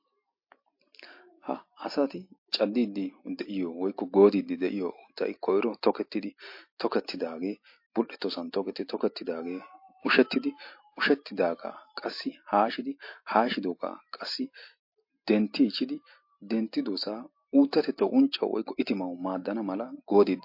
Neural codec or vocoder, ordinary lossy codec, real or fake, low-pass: none; MP3, 32 kbps; real; 5.4 kHz